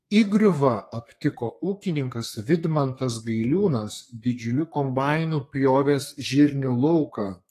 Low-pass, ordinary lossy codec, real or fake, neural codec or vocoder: 14.4 kHz; AAC, 48 kbps; fake; codec, 32 kHz, 1.9 kbps, SNAC